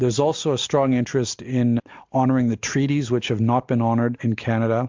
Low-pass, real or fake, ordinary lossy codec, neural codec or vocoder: 7.2 kHz; real; MP3, 64 kbps; none